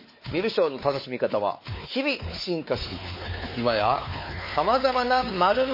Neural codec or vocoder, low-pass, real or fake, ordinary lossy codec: codec, 16 kHz, 4 kbps, X-Codec, WavLM features, trained on Multilingual LibriSpeech; 5.4 kHz; fake; MP3, 24 kbps